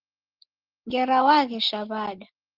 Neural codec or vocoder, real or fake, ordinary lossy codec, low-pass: none; real; Opus, 16 kbps; 5.4 kHz